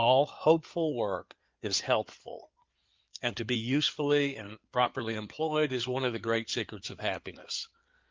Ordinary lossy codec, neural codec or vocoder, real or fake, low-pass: Opus, 32 kbps; codec, 16 kHz in and 24 kHz out, 2.2 kbps, FireRedTTS-2 codec; fake; 7.2 kHz